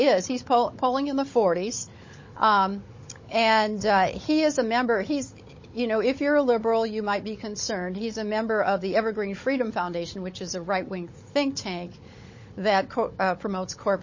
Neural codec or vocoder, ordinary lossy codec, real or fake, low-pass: codec, 16 kHz, 16 kbps, FunCodec, trained on Chinese and English, 50 frames a second; MP3, 32 kbps; fake; 7.2 kHz